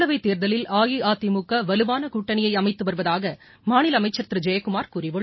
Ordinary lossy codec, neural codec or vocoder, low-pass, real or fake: MP3, 24 kbps; none; 7.2 kHz; real